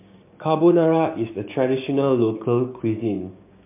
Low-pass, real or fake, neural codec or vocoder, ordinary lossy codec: 3.6 kHz; fake; codec, 16 kHz, 6 kbps, DAC; MP3, 32 kbps